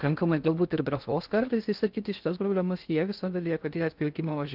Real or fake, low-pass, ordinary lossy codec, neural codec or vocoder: fake; 5.4 kHz; Opus, 32 kbps; codec, 16 kHz in and 24 kHz out, 0.6 kbps, FocalCodec, streaming, 4096 codes